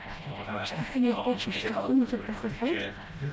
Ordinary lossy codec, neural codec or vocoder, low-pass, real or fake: none; codec, 16 kHz, 0.5 kbps, FreqCodec, smaller model; none; fake